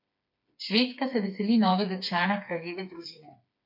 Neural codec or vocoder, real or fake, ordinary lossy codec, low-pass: codec, 16 kHz, 4 kbps, FreqCodec, smaller model; fake; MP3, 32 kbps; 5.4 kHz